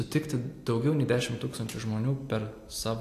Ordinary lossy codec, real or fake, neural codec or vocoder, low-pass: AAC, 64 kbps; real; none; 14.4 kHz